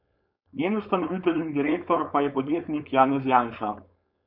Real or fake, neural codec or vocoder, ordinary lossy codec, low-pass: fake; codec, 16 kHz, 4.8 kbps, FACodec; none; 5.4 kHz